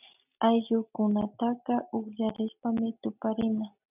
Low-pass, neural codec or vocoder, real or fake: 3.6 kHz; none; real